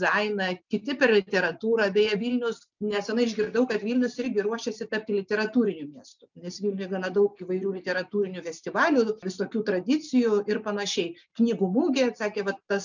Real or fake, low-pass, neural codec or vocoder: real; 7.2 kHz; none